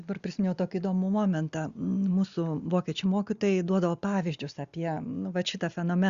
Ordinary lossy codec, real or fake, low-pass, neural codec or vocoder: Opus, 64 kbps; real; 7.2 kHz; none